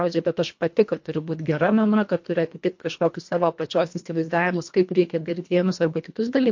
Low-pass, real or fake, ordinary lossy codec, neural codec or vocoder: 7.2 kHz; fake; MP3, 48 kbps; codec, 24 kHz, 1.5 kbps, HILCodec